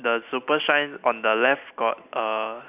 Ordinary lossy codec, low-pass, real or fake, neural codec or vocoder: none; 3.6 kHz; real; none